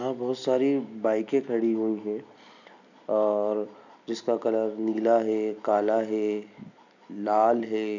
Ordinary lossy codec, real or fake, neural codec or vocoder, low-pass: none; real; none; 7.2 kHz